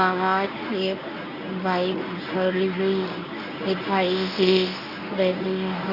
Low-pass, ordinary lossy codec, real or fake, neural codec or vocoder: 5.4 kHz; none; fake; codec, 24 kHz, 0.9 kbps, WavTokenizer, medium speech release version 1